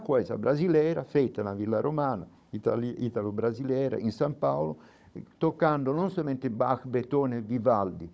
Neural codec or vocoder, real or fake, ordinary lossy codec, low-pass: codec, 16 kHz, 16 kbps, FunCodec, trained on Chinese and English, 50 frames a second; fake; none; none